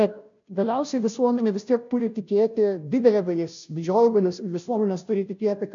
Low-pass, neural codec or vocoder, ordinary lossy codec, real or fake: 7.2 kHz; codec, 16 kHz, 0.5 kbps, FunCodec, trained on Chinese and English, 25 frames a second; AAC, 64 kbps; fake